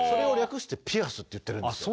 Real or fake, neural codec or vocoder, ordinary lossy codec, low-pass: real; none; none; none